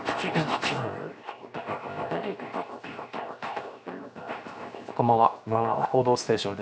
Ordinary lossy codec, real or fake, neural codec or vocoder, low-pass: none; fake; codec, 16 kHz, 0.7 kbps, FocalCodec; none